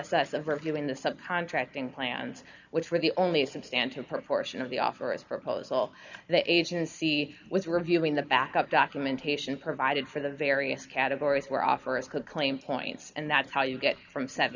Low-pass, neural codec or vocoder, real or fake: 7.2 kHz; none; real